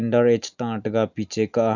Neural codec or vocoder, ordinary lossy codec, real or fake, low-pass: none; none; real; 7.2 kHz